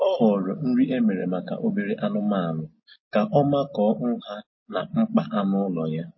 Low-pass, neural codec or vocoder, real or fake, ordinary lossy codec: 7.2 kHz; none; real; MP3, 24 kbps